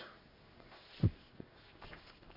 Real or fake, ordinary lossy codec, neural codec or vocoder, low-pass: fake; none; codec, 16 kHz in and 24 kHz out, 1 kbps, XY-Tokenizer; 5.4 kHz